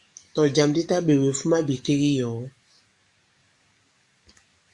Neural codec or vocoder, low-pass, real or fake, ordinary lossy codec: codec, 44.1 kHz, 7.8 kbps, DAC; 10.8 kHz; fake; Opus, 64 kbps